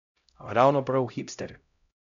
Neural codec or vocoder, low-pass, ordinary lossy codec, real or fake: codec, 16 kHz, 0.5 kbps, X-Codec, HuBERT features, trained on LibriSpeech; 7.2 kHz; MP3, 96 kbps; fake